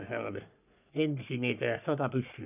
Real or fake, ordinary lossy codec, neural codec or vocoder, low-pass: fake; none; codec, 44.1 kHz, 3.4 kbps, Pupu-Codec; 3.6 kHz